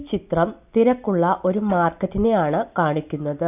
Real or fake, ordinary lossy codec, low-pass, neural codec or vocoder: real; none; 3.6 kHz; none